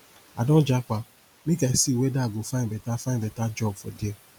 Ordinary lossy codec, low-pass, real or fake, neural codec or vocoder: none; none; real; none